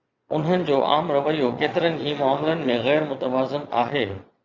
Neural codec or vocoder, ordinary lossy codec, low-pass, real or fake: vocoder, 22.05 kHz, 80 mel bands, Vocos; Opus, 64 kbps; 7.2 kHz; fake